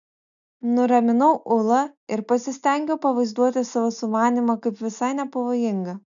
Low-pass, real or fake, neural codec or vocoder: 7.2 kHz; real; none